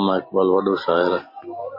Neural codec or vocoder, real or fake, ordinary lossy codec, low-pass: none; real; MP3, 24 kbps; 5.4 kHz